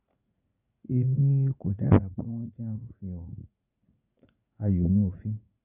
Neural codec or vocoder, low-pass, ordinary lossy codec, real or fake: vocoder, 44.1 kHz, 80 mel bands, Vocos; 3.6 kHz; none; fake